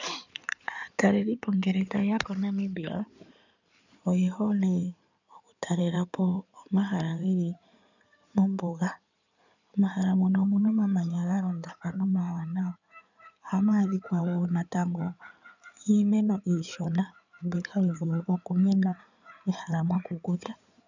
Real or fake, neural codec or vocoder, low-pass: fake; codec, 16 kHz in and 24 kHz out, 2.2 kbps, FireRedTTS-2 codec; 7.2 kHz